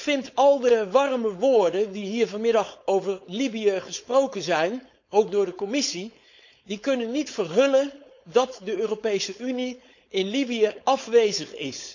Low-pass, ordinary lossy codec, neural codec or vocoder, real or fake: 7.2 kHz; none; codec, 16 kHz, 4.8 kbps, FACodec; fake